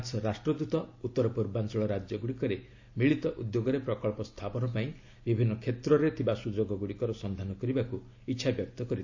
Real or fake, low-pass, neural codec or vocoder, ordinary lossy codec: real; 7.2 kHz; none; AAC, 48 kbps